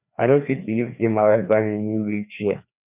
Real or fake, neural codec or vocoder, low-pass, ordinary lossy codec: fake; codec, 16 kHz, 2 kbps, FreqCodec, larger model; 3.6 kHz; none